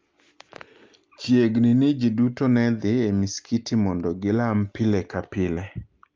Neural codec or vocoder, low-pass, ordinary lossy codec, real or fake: none; 7.2 kHz; Opus, 24 kbps; real